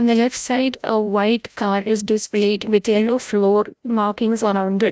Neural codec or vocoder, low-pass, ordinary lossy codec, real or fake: codec, 16 kHz, 0.5 kbps, FreqCodec, larger model; none; none; fake